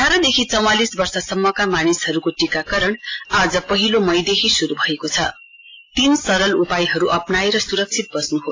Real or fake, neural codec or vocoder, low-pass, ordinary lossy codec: real; none; 7.2 kHz; AAC, 32 kbps